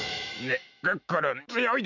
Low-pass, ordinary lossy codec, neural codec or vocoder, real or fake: 7.2 kHz; none; autoencoder, 48 kHz, 128 numbers a frame, DAC-VAE, trained on Japanese speech; fake